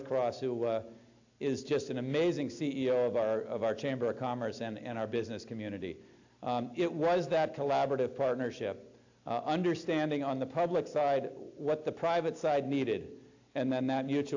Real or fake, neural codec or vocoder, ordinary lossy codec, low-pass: real; none; AAC, 48 kbps; 7.2 kHz